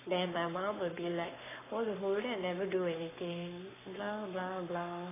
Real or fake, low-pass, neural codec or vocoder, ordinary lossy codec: fake; 3.6 kHz; codec, 44.1 kHz, 7.8 kbps, Pupu-Codec; AAC, 16 kbps